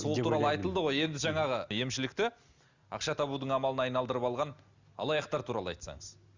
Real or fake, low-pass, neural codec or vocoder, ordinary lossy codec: real; 7.2 kHz; none; Opus, 64 kbps